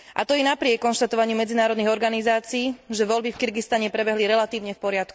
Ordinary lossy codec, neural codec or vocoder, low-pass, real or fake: none; none; none; real